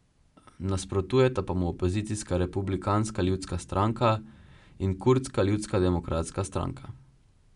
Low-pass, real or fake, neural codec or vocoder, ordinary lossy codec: 10.8 kHz; real; none; none